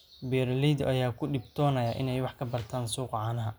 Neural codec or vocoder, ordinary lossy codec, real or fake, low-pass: none; none; real; none